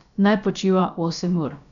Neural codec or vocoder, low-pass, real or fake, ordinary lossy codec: codec, 16 kHz, about 1 kbps, DyCAST, with the encoder's durations; 7.2 kHz; fake; none